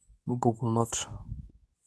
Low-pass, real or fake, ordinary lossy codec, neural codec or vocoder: none; fake; none; codec, 24 kHz, 0.9 kbps, WavTokenizer, medium speech release version 2